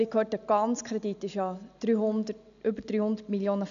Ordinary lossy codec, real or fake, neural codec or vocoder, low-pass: none; real; none; 7.2 kHz